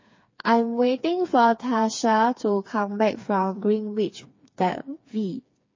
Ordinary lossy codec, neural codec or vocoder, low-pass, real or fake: MP3, 32 kbps; codec, 16 kHz, 4 kbps, FreqCodec, smaller model; 7.2 kHz; fake